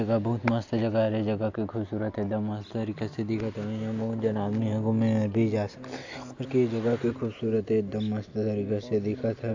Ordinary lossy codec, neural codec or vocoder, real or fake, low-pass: AAC, 48 kbps; none; real; 7.2 kHz